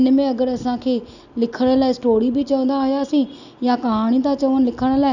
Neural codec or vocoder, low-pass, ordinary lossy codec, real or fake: none; 7.2 kHz; none; real